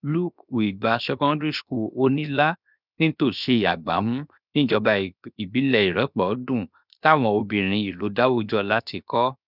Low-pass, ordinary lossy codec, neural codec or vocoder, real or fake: 5.4 kHz; none; codec, 16 kHz, 0.7 kbps, FocalCodec; fake